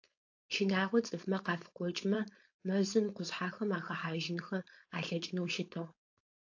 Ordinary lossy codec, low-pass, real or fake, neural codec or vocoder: AAC, 48 kbps; 7.2 kHz; fake; codec, 16 kHz, 4.8 kbps, FACodec